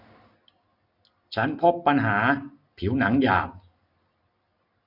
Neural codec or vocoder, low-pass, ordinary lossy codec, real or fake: none; 5.4 kHz; none; real